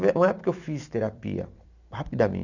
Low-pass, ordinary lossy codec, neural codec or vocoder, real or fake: 7.2 kHz; none; vocoder, 44.1 kHz, 128 mel bands every 256 samples, BigVGAN v2; fake